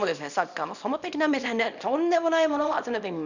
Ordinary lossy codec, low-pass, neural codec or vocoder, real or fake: none; 7.2 kHz; codec, 24 kHz, 0.9 kbps, WavTokenizer, small release; fake